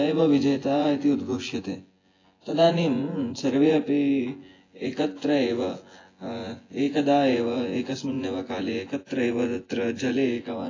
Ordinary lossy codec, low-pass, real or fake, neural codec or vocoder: AAC, 32 kbps; 7.2 kHz; fake; vocoder, 24 kHz, 100 mel bands, Vocos